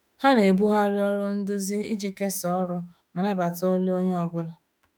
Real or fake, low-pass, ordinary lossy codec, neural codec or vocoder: fake; none; none; autoencoder, 48 kHz, 32 numbers a frame, DAC-VAE, trained on Japanese speech